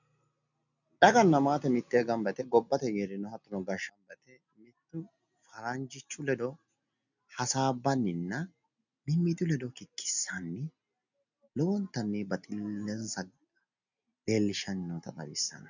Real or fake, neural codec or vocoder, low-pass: real; none; 7.2 kHz